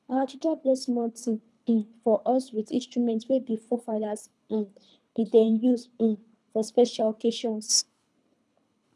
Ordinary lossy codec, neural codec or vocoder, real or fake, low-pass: none; codec, 24 kHz, 3 kbps, HILCodec; fake; none